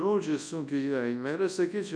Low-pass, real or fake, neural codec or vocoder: 9.9 kHz; fake; codec, 24 kHz, 0.9 kbps, WavTokenizer, large speech release